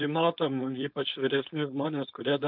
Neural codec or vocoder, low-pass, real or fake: codec, 16 kHz, 4.8 kbps, FACodec; 5.4 kHz; fake